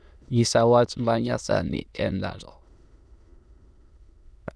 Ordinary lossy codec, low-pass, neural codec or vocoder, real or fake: none; none; autoencoder, 22.05 kHz, a latent of 192 numbers a frame, VITS, trained on many speakers; fake